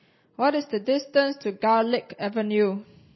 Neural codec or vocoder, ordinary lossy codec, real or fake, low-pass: none; MP3, 24 kbps; real; 7.2 kHz